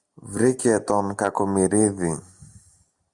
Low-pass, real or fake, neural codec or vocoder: 10.8 kHz; real; none